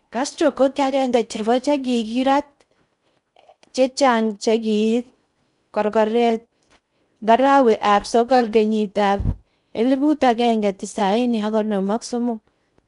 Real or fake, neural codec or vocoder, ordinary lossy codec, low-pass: fake; codec, 16 kHz in and 24 kHz out, 0.6 kbps, FocalCodec, streaming, 4096 codes; none; 10.8 kHz